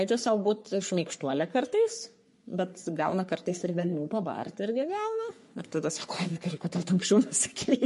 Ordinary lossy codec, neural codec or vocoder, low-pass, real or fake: MP3, 48 kbps; codec, 44.1 kHz, 3.4 kbps, Pupu-Codec; 14.4 kHz; fake